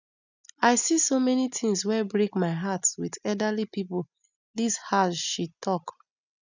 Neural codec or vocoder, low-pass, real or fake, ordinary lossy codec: none; 7.2 kHz; real; none